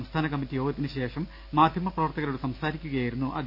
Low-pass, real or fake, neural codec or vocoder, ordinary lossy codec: 5.4 kHz; real; none; AAC, 48 kbps